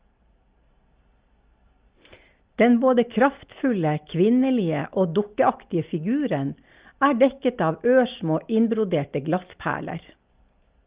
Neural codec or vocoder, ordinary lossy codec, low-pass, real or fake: none; Opus, 32 kbps; 3.6 kHz; real